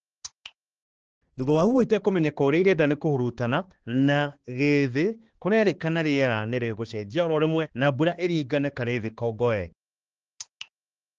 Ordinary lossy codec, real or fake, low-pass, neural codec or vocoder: Opus, 16 kbps; fake; 7.2 kHz; codec, 16 kHz, 2 kbps, X-Codec, HuBERT features, trained on balanced general audio